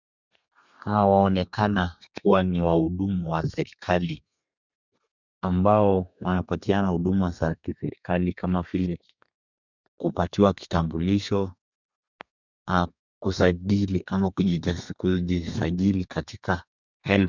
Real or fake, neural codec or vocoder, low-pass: fake; codec, 32 kHz, 1.9 kbps, SNAC; 7.2 kHz